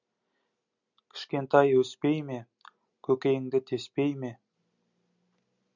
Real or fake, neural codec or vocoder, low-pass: real; none; 7.2 kHz